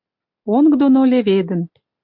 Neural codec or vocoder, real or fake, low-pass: none; real; 5.4 kHz